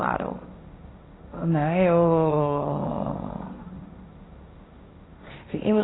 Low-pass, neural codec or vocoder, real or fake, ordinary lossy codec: 7.2 kHz; codec, 16 kHz, 1.1 kbps, Voila-Tokenizer; fake; AAC, 16 kbps